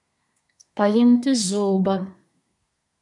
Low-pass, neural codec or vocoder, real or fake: 10.8 kHz; codec, 24 kHz, 1 kbps, SNAC; fake